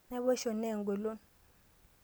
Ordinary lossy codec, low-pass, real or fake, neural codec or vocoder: none; none; real; none